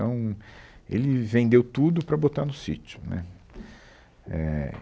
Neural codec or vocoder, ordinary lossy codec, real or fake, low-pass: none; none; real; none